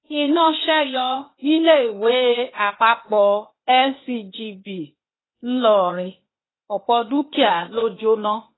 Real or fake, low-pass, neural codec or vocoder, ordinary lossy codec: fake; 7.2 kHz; codec, 16 kHz, 0.8 kbps, ZipCodec; AAC, 16 kbps